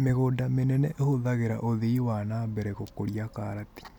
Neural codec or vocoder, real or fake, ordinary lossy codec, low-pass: none; real; none; 19.8 kHz